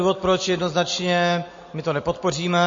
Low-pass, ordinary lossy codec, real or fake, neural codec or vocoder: 7.2 kHz; MP3, 32 kbps; real; none